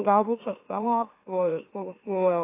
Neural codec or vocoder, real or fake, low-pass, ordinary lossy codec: autoencoder, 44.1 kHz, a latent of 192 numbers a frame, MeloTTS; fake; 3.6 kHz; AAC, 24 kbps